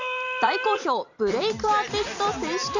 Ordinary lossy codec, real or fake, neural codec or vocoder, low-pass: none; fake; vocoder, 44.1 kHz, 128 mel bands every 256 samples, BigVGAN v2; 7.2 kHz